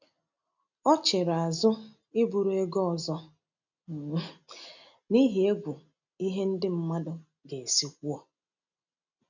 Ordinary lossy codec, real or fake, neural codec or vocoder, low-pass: none; real; none; 7.2 kHz